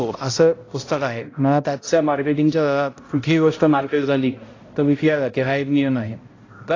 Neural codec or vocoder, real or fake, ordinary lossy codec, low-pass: codec, 16 kHz, 0.5 kbps, X-Codec, HuBERT features, trained on balanced general audio; fake; AAC, 32 kbps; 7.2 kHz